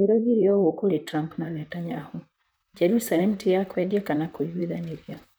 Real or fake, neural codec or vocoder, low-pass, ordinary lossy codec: fake; vocoder, 44.1 kHz, 128 mel bands, Pupu-Vocoder; none; none